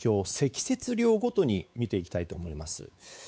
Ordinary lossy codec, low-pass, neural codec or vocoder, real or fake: none; none; codec, 16 kHz, 4 kbps, X-Codec, WavLM features, trained on Multilingual LibriSpeech; fake